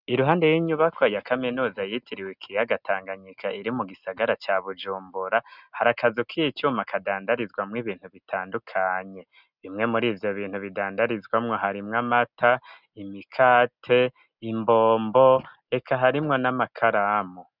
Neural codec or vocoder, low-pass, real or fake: none; 5.4 kHz; real